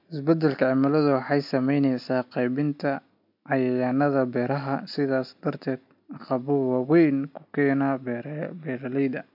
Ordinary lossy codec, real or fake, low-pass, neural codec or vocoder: MP3, 48 kbps; real; 5.4 kHz; none